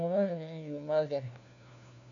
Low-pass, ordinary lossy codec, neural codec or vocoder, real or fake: 7.2 kHz; MP3, 96 kbps; codec, 16 kHz, 1 kbps, FunCodec, trained on LibriTTS, 50 frames a second; fake